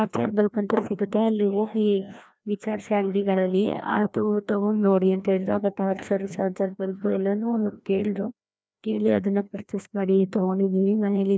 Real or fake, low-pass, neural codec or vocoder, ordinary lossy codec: fake; none; codec, 16 kHz, 1 kbps, FreqCodec, larger model; none